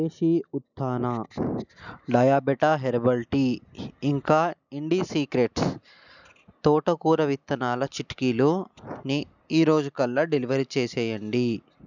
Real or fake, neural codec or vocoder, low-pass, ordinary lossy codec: real; none; 7.2 kHz; none